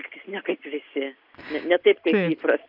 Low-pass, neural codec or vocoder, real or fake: 5.4 kHz; none; real